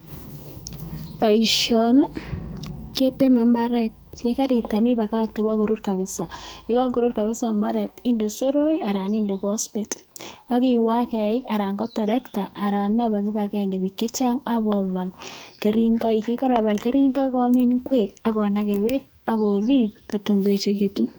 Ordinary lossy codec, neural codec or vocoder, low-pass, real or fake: none; codec, 44.1 kHz, 2.6 kbps, SNAC; none; fake